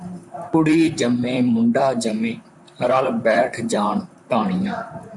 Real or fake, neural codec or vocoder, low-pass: fake; vocoder, 44.1 kHz, 128 mel bands, Pupu-Vocoder; 10.8 kHz